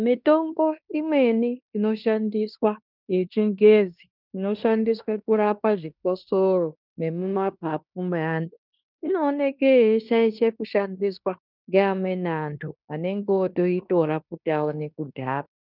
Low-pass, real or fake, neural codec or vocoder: 5.4 kHz; fake; codec, 16 kHz in and 24 kHz out, 0.9 kbps, LongCat-Audio-Codec, fine tuned four codebook decoder